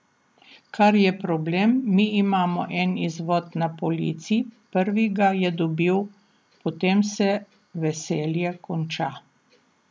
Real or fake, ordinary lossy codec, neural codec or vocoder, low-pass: real; none; none; none